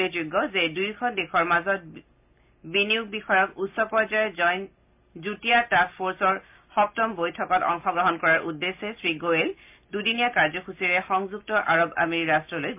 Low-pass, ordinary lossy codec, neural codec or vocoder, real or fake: 3.6 kHz; MP3, 32 kbps; none; real